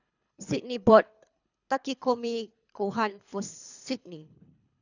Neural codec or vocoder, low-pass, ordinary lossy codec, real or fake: codec, 24 kHz, 3 kbps, HILCodec; 7.2 kHz; none; fake